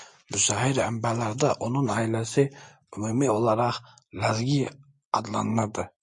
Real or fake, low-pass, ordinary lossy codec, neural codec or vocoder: real; 10.8 kHz; MP3, 48 kbps; none